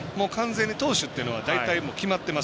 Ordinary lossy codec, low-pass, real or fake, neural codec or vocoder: none; none; real; none